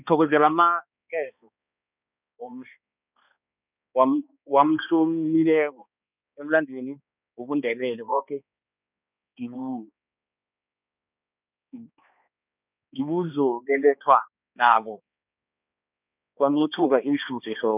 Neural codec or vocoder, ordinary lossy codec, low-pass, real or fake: codec, 16 kHz, 2 kbps, X-Codec, HuBERT features, trained on balanced general audio; none; 3.6 kHz; fake